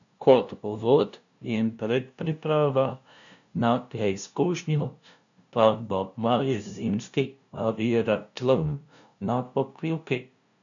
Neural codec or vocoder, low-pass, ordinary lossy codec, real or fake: codec, 16 kHz, 0.5 kbps, FunCodec, trained on LibriTTS, 25 frames a second; 7.2 kHz; MP3, 96 kbps; fake